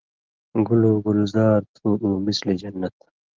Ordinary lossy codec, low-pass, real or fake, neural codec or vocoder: Opus, 16 kbps; 7.2 kHz; real; none